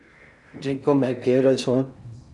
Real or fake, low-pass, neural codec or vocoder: fake; 10.8 kHz; codec, 16 kHz in and 24 kHz out, 0.6 kbps, FocalCodec, streaming, 4096 codes